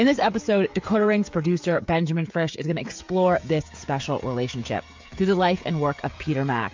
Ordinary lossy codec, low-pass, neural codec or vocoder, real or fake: MP3, 48 kbps; 7.2 kHz; codec, 16 kHz, 16 kbps, FreqCodec, smaller model; fake